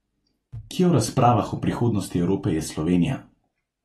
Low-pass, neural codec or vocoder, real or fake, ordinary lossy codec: 19.8 kHz; none; real; AAC, 32 kbps